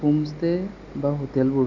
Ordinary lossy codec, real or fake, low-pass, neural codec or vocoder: none; real; 7.2 kHz; none